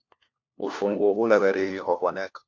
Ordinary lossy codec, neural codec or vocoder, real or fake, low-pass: MP3, 48 kbps; codec, 16 kHz, 1 kbps, FunCodec, trained on LibriTTS, 50 frames a second; fake; 7.2 kHz